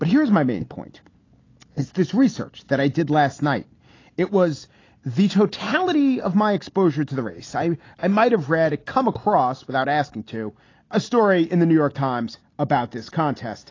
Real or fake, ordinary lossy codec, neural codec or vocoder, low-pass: real; AAC, 32 kbps; none; 7.2 kHz